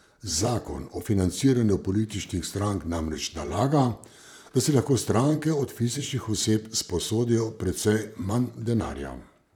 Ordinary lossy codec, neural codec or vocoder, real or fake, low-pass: none; vocoder, 44.1 kHz, 128 mel bands, Pupu-Vocoder; fake; 19.8 kHz